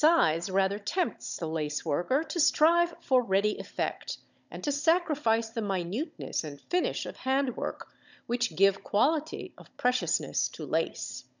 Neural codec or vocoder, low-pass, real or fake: codec, 16 kHz, 16 kbps, FunCodec, trained on Chinese and English, 50 frames a second; 7.2 kHz; fake